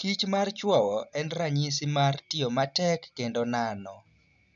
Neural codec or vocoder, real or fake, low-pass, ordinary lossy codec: none; real; 7.2 kHz; none